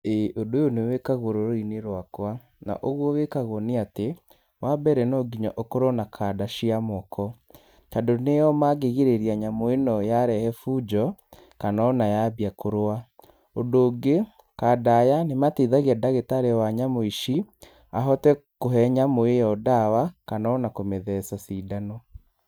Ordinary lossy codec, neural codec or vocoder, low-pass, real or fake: none; none; none; real